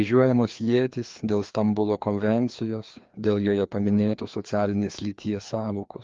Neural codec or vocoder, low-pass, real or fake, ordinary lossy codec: codec, 16 kHz, 2 kbps, FreqCodec, larger model; 7.2 kHz; fake; Opus, 32 kbps